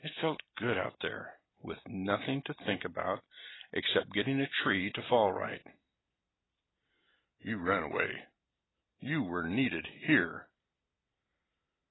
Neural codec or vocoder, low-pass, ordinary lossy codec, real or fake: none; 7.2 kHz; AAC, 16 kbps; real